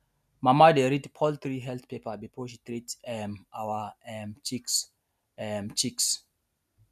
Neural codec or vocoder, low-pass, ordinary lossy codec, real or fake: none; 14.4 kHz; none; real